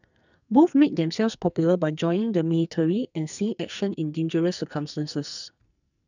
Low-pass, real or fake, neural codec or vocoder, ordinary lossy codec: 7.2 kHz; fake; codec, 44.1 kHz, 2.6 kbps, SNAC; none